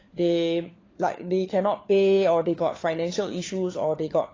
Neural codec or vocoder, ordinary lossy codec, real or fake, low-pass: codec, 16 kHz, 4 kbps, FunCodec, trained on LibriTTS, 50 frames a second; AAC, 32 kbps; fake; 7.2 kHz